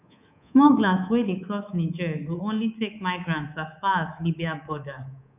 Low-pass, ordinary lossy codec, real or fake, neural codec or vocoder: 3.6 kHz; none; fake; codec, 24 kHz, 3.1 kbps, DualCodec